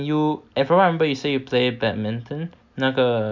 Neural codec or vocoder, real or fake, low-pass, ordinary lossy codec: none; real; 7.2 kHz; MP3, 64 kbps